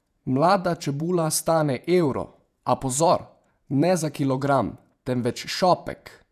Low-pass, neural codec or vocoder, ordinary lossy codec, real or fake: 14.4 kHz; vocoder, 48 kHz, 128 mel bands, Vocos; none; fake